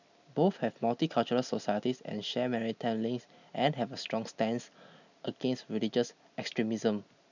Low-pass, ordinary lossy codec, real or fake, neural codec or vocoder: 7.2 kHz; none; real; none